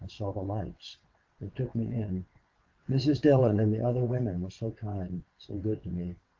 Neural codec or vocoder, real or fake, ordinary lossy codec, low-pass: vocoder, 22.05 kHz, 80 mel bands, WaveNeXt; fake; Opus, 32 kbps; 7.2 kHz